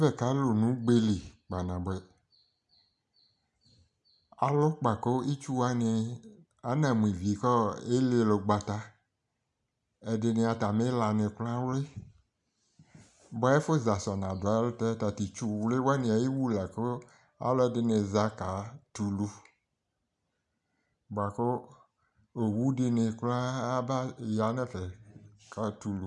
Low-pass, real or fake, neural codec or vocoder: 10.8 kHz; real; none